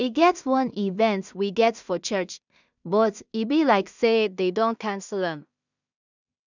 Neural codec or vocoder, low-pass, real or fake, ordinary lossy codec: codec, 16 kHz in and 24 kHz out, 0.4 kbps, LongCat-Audio-Codec, two codebook decoder; 7.2 kHz; fake; none